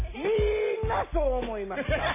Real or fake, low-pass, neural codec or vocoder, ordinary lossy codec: real; 3.6 kHz; none; MP3, 16 kbps